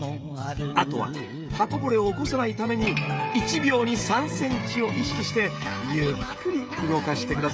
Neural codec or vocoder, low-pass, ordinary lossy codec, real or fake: codec, 16 kHz, 16 kbps, FreqCodec, smaller model; none; none; fake